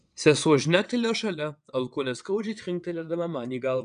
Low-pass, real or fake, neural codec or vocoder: 9.9 kHz; fake; vocoder, 22.05 kHz, 80 mel bands, WaveNeXt